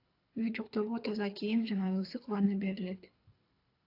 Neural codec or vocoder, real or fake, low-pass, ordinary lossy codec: codec, 24 kHz, 3 kbps, HILCodec; fake; 5.4 kHz; AAC, 32 kbps